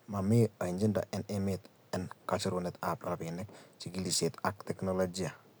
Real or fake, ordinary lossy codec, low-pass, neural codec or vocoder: real; none; none; none